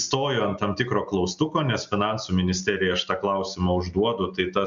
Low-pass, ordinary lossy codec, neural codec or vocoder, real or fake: 7.2 kHz; MP3, 96 kbps; none; real